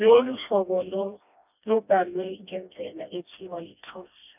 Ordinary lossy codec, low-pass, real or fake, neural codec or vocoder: none; 3.6 kHz; fake; codec, 16 kHz, 1 kbps, FreqCodec, smaller model